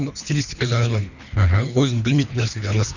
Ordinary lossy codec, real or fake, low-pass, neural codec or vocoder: none; fake; 7.2 kHz; codec, 24 kHz, 3 kbps, HILCodec